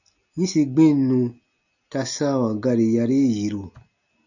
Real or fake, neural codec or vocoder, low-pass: real; none; 7.2 kHz